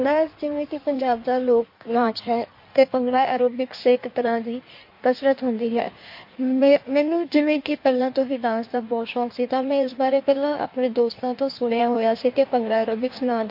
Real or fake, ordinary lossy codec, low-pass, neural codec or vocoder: fake; MP3, 32 kbps; 5.4 kHz; codec, 16 kHz in and 24 kHz out, 1.1 kbps, FireRedTTS-2 codec